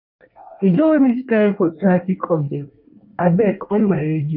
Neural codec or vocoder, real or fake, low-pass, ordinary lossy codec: codec, 24 kHz, 1 kbps, SNAC; fake; 5.4 kHz; none